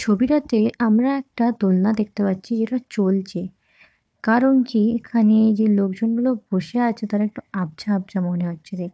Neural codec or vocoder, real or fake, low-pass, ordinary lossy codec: codec, 16 kHz, 4 kbps, FunCodec, trained on Chinese and English, 50 frames a second; fake; none; none